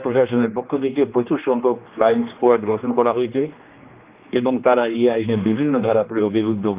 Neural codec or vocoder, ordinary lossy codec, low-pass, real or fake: codec, 16 kHz, 2 kbps, X-Codec, HuBERT features, trained on general audio; Opus, 24 kbps; 3.6 kHz; fake